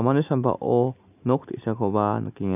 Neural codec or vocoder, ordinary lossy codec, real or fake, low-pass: none; none; real; 3.6 kHz